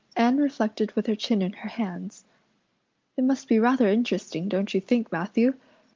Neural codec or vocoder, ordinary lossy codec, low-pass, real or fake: none; Opus, 24 kbps; 7.2 kHz; real